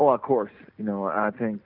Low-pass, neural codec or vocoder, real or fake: 5.4 kHz; none; real